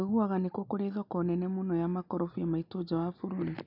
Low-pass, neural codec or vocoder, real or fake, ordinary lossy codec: 5.4 kHz; none; real; none